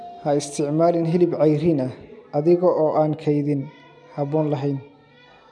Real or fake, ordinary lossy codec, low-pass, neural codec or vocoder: real; none; none; none